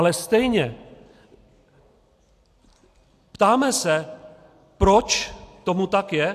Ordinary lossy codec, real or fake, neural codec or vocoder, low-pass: AAC, 64 kbps; real; none; 14.4 kHz